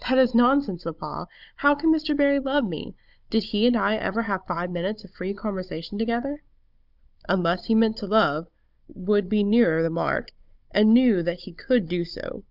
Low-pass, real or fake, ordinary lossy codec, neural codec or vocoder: 5.4 kHz; fake; Opus, 64 kbps; codec, 16 kHz, 16 kbps, FunCodec, trained on Chinese and English, 50 frames a second